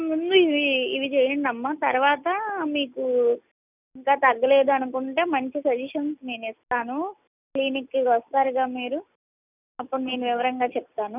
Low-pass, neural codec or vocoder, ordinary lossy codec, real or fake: 3.6 kHz; none; none; real